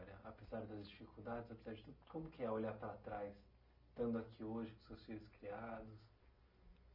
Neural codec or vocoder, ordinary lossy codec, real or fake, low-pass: none; none; real; 5.4 kHz